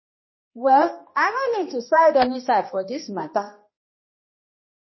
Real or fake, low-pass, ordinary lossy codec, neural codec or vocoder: fake; 7.2 kHz; MP3, 24 kbps; codec, 16 kHz, 1 kbps, X-Codec, HuBERT features, trained on balanced general audio